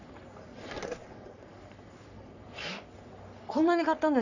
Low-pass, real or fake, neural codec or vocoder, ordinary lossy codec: 7.2 kHz; fake; codec, 44.1 kHz, 3.4 kbps, Pupu-Codec; none